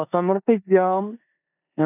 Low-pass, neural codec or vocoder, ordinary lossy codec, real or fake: 3.6 kHz; codec, 16 kHz in and 24 kHz out, 0.9 kbps, LongCat-Audio-Codec, four codebook decoder; none; fake